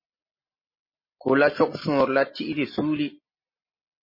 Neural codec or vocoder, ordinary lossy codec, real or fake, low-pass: none; MP3, 24 kbps; real; 5.4 kHz